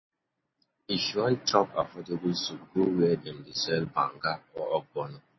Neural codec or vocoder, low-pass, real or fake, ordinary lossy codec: none; 7.2 kHz; real; MP3, 24 kbps